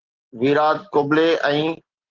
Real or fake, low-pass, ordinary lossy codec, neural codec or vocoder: real; 7.2 kHz; Opus, 16 kbps; none